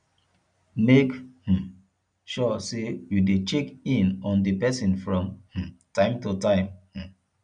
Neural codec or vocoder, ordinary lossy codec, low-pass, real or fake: none; none; 9.9 kHz; real